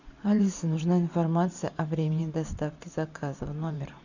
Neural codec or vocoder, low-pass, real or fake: vocoder, 44.1 kHz, 128 mel bands every 512 samples, BigVGAN v2; 7.2 kHz; fake